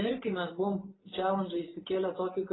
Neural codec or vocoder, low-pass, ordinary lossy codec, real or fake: none; 7.2 kHz; AAC, 16 kbps; real